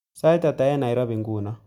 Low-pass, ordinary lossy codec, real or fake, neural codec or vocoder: 19.8 kHz; MP3, 96 kbps; real; none